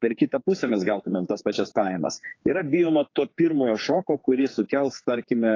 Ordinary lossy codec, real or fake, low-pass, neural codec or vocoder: AAC, 32 kbps; fake; 7.2 kHz; codec, 16 kHz, 4 kbps, X-Codec, HuBERT features, trained on general audio